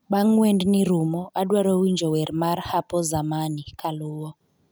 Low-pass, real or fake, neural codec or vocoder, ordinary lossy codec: none; real; none; none